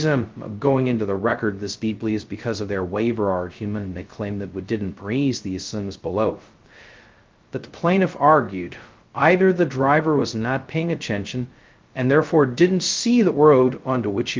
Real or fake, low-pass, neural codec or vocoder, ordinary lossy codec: fake; 7.2 kHz; codec, 16 kHz, 0.2 kbps, FocalCodec; Opus, 32 kbps